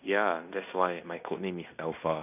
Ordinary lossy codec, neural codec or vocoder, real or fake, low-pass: none; codec, 16 kHz in and 24 kHz out, 0.9 kbps, LongCat-Audio-Codec, fine tuned four codebook decoder; fake; 3.6 kHz